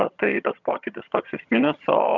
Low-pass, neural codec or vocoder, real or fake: 7.2 kHz; vocoder, 22.05 kHz, 80 mel bands, HiFi-GAN; fake